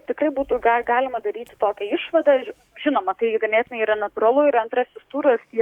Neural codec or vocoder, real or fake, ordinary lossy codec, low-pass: codec, 44.1 kHz, 7.8 kbps, DAC; fake; Opus, 64 kbps; 19.8 kHz